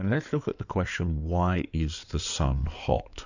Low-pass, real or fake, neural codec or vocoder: 7.2 kHz; fake; codec, 16 kHz in and 24 kHz out, 2.2 kbps, FireRedTTS-2 codec